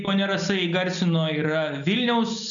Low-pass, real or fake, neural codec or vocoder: 7.2 kHz; real; none